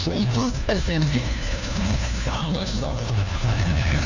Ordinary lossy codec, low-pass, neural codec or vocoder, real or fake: none; 7.2 kHz; codec, 16 kHz, 1 kbps, FunCodec, trained on LibriTTS, 50 frames a second; fake